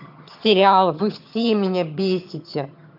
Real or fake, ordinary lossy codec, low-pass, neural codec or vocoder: fake; none; 5.4 kHz; vocoder, 22.05 kHz, 80 mel bands, HiFi-GAN